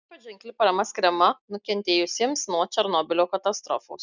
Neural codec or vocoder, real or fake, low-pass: none; real; 7.2 kHz